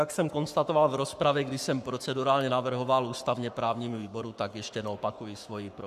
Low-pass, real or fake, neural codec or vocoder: 14.4 kHz; fake; codec, 44.1 kHz, 7.8 kbps, Pupu-Codec